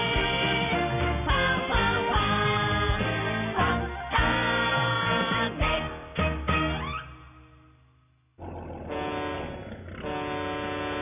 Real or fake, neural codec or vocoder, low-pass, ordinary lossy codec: real; none; 3.6 kHz; none